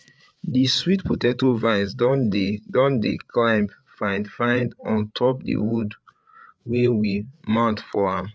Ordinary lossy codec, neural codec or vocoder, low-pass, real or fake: none; codec, 16 kHz, 8 kbps, FreqCodec, larger model; none; fake